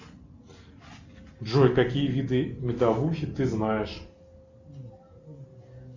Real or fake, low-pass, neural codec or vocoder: real; 7.2 kHz; none